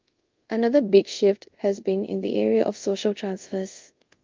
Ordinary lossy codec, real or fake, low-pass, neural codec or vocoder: Opus, 24 kbps; fake; 7.2 kHz; codec, 24 kHz, 0.5 kbps, DualCodec